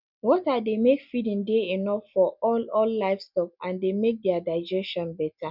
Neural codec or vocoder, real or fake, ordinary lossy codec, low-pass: none; real; none; 5.4 kHz